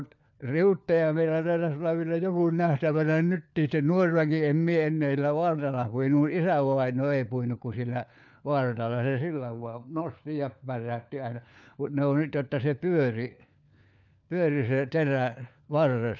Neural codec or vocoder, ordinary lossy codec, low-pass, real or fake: codec, 16 kHz, 4 kbps, FunCodec, trained on LibriTTS, 50 frames a second; none; 7.2 kHz; fake